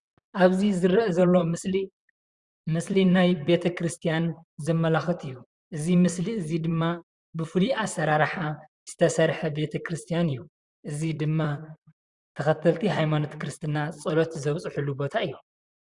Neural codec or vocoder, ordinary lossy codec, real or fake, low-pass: vocoder, 44.1 kHz, 128 mel bands, Pupu-Vocoder; Opus, 64 kbps; fake; 10.8 kHz